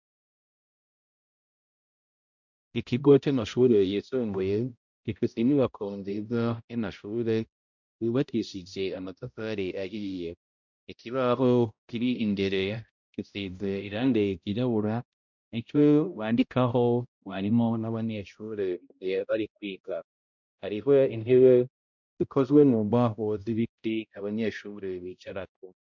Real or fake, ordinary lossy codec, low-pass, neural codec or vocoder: fake; MP3, 64 kbps; 7.2 kHz; codec, 16 kHz, 0.5 kbps, X-Codec, HuBERT features, trained on balanced general audio